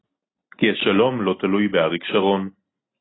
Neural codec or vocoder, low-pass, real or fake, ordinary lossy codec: none; 7.2 kHz; real; AAC, 16 kbps